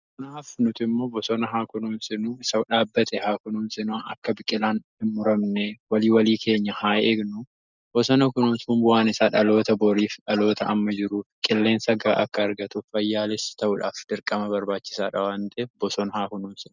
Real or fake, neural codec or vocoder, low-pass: real; none; 7.2 kHz